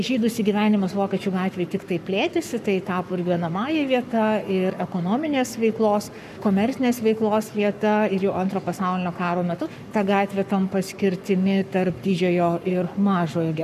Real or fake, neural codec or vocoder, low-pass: fake; codec, 44.1 kHz, 7.8 kbps, Pupu-Codec; 14.4 kHz